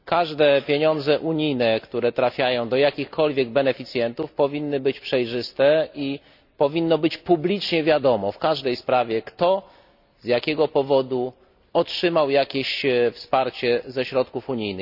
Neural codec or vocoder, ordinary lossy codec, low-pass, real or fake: none; none; 5.4 kHz; real